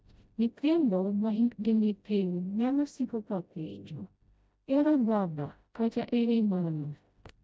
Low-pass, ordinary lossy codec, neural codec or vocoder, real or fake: none; none; codec, 16 kHz, 0.5 kbps, FreqCodec, smaller model; fake